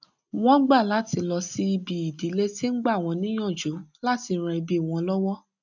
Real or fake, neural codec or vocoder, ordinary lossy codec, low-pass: real; none; none; 7.2 kHz